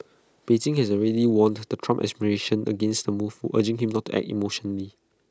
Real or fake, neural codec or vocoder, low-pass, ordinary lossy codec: real; none; none; none